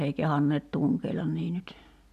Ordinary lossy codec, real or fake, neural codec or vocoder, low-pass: none; real; none; 14.4 kHz